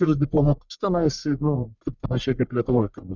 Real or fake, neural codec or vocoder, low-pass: fake; codec, 44.1 kHz, 1.7 kbps, Pupu-Codec; 7.2 kHz